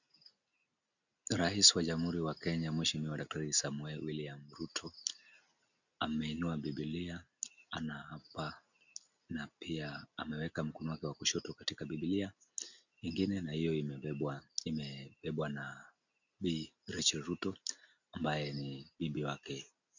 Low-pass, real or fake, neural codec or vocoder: 7.2 kHz; real; none